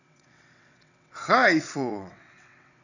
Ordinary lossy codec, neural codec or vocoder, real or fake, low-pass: none; vocoder, 22.05 kHz, 80 mel bands, WaveNeXt; fake; 7.2 kHz